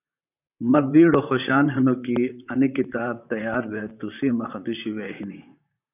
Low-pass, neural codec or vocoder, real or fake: 3.6 kHz; vocoder, 44.1 kHz, 128 mel bands, Pupu-Vocoder; fake